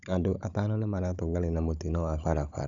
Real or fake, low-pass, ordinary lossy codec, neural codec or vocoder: fake; 7.2 kHz; none; codec, 16 kHz, 16 kbps, FunCodec, trained on Chinese and English, 50 frames a second